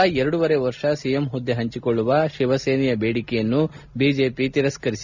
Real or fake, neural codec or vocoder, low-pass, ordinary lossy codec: real; none; 7.2 kHz; none